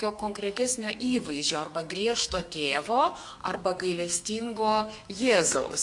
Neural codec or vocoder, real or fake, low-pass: codec, 32 kHz, 1.9 kbps, SNAC; fake; 10.8 kHz